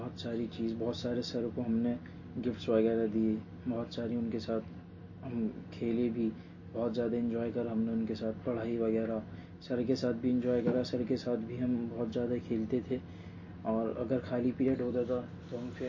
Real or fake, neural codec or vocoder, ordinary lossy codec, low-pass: real; none; MP3, 32 kbps; 7.2 kHz